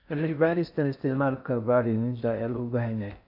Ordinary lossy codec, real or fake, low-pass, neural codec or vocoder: none; fake; 5.4 kHz; codec, 16 kHz in and 24 kHz out, 0.6 kbps, FocalCodec, streaming, 4096 codes